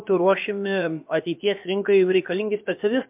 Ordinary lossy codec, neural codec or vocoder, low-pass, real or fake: MP3, 32 kbps; codec, 16 kHz, about 1 kbps, DyCAST, with the encoder's durations; 3.6 kHz; fake